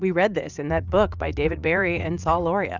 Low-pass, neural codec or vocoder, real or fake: 7.2 kHz; vocoder, 44.1 kHz, 80 mel bands, Vocos; fake